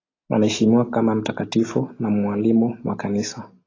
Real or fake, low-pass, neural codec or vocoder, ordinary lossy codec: real; 7.2 kHz; none; AAC, 32 kbps